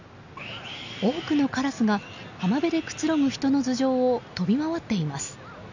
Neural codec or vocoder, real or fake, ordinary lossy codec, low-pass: none; real; none; 7.2 kHz